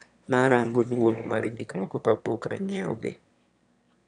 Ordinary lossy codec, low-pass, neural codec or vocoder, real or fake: none; 9.9 kHz; autoencoder, 22.05 kHz, a latent of 192 numbers a frame, VITS, trained on one speaker; fake